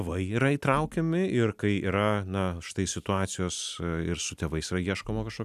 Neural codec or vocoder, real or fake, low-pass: none; real; 14.4 kHz